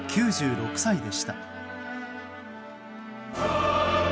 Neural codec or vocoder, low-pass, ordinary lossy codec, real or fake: none; none; none; real